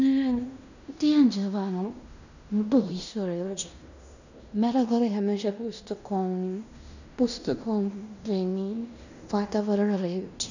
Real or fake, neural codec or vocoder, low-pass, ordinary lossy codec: fake; codec, 16 kHz in and 24 kHz out, 0.9 kbps, LongCat-Audio-Codec, fine tuned four codebook decoder; 7.2 kHz; none